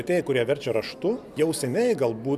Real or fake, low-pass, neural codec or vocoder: real; 14.4 kHz; none